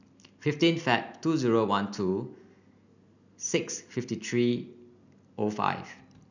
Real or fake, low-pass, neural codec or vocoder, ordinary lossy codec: real; 7.2 kHz; none; none